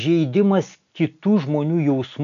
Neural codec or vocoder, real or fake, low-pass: none; real; 7.2 kHz